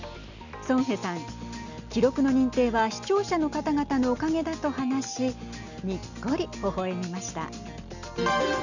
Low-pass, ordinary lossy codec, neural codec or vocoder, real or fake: 7.2 kHz; none; none; real